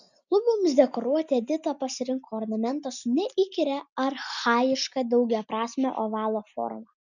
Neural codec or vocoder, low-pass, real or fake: none; 7.2 kHz; real